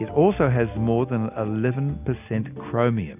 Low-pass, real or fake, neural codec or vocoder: 3.6 kHz; real; none